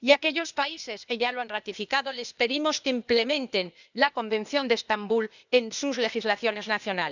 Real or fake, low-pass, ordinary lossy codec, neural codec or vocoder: fake; 7.2 kHz; none; codec, 16 kHz, 0.8 kbps, ZipCodec